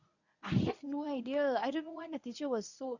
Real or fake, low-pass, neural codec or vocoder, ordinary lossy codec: fake; 7.2 kHz; codec, 24 kHz, 0.9 kbps, WavTokenizer, medium speech release version 1; none